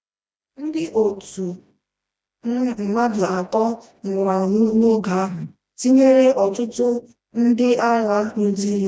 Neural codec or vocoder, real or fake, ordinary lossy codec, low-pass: codec, 16 kHz, 1 kbps, FreqCodec, smaller model; fake; none; none